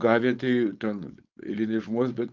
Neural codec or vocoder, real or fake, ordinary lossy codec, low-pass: codec, 16 kHz, 4.8 kbps, FACodec; fake; Opus, 24 kbps; 7.2 kHz